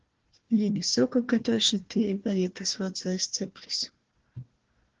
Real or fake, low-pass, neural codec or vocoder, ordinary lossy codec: fake; 7.2 kHz; codec, 16 kHz, 1 kbps, FunCodec, trained on Chinese and English, 50 frames a second; Opus, 16 kbps